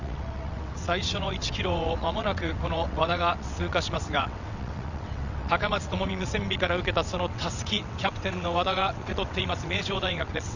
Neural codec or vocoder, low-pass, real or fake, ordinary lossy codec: vocoder, 22.05 kHz, 80 mel bands, WaveNeXt; 7.2 kHz; fake; none